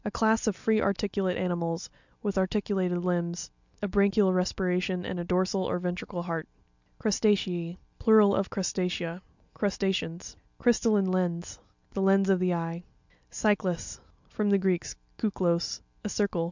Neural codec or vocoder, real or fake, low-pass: none; real; 7.2 kHz